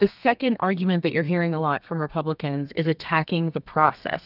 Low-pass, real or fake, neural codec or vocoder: 5.4 kHz; fake; codec, 32 kHz, 1.9 kbps, SNAC